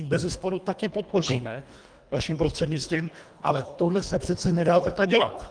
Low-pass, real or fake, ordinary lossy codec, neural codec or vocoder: 9.9 kHz; fake; Opus, 32 kbps; codec, 24 kHz, 1.5 kbps, HILCodec